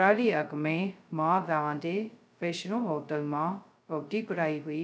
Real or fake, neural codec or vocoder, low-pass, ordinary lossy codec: fake; codec, 16 kHz, 0.2 kbps, FocalCodec; none; none